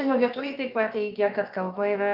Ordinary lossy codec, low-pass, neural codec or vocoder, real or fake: Opus, 24 kbps; 5.4 kHz; codec, 16 kHz, about 1 kbps, DyCAST, with the encoder's durations; fake